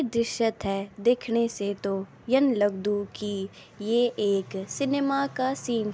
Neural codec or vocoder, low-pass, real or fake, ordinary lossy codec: none; none; real; none